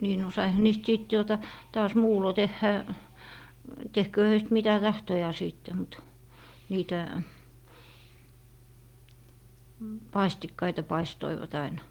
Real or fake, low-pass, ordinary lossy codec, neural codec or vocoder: real; 19.8 kHz; Opus, 24 kbps; none